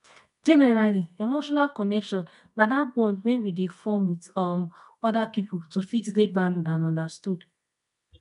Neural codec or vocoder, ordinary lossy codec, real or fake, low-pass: codec, 24 kHz, 0.9 kbps, WavTokenizer, medium music audio release; MP3, 96 kbps; fake; 10.8 kHz